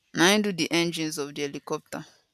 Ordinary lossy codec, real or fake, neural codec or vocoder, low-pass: none; real; none; 14.4 kHz